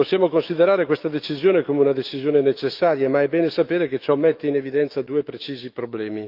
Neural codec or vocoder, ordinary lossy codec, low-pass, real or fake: autoencoder, 48 kHz, 128 numbers a frame, DAC-VAE, trained on Japanese speech; Opus, 24 kbps; 5.4 kHz; fake